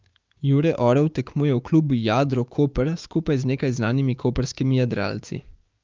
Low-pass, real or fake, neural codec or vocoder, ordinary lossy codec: 7.2 kHz; fake; codec, 16 kHz, 6 kbps, DAC; Opus, 24 kbps